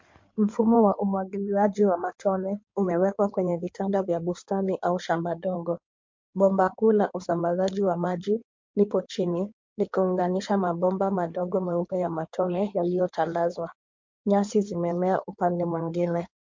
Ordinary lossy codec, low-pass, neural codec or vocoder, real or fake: MP3, 48 kbps; 7.2 kHz; codec, 16 kHz in and 24 kHz out, 2.2 kbps, FireRedTTS-2 codec; fake